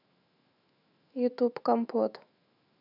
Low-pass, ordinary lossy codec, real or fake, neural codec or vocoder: 5.4 kHz; none; fake; autoencoder, 48 kHz, 128 numbers a frame, DAC-VAE, trained on Japanese speech